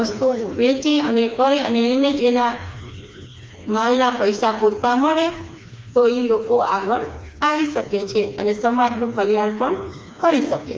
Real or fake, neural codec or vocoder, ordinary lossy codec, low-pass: fake; codec, 16 kHz, 2 kbps, FreqCodec, smaller model; none; none